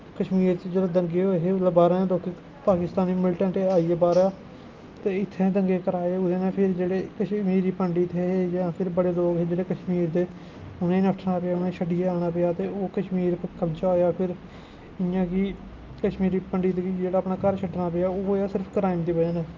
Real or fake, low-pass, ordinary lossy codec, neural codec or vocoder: real; 7.2 kHz; Opus, 32 kbps; none